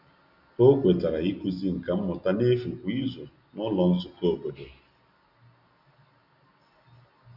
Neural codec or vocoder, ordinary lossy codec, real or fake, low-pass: none; none; real; 5.4 kHz